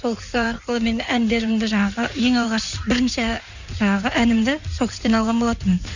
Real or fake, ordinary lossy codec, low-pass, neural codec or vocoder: fake; none; 7.2 kHz; codec, 16 kHz in and 24 kHz out, 2.2 kbps, FireRedTTS-2 codec